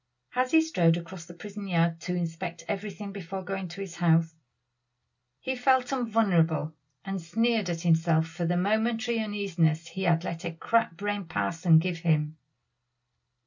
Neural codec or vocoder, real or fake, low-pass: none; real; 7.2 kHz